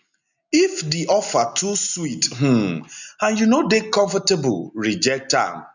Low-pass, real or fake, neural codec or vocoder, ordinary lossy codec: 7.2 kHz; real; none; none